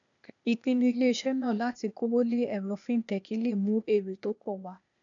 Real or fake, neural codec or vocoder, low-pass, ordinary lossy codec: fake; codec, 16 kHz, 0.8 kbps, ZipCodec; 7.2 kHz; none